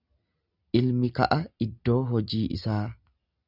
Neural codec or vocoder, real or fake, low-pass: none; real; 5.4 kHz